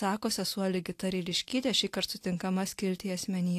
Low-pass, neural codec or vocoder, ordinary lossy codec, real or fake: 14.4 kHz; none; AAC, 64 kbps; real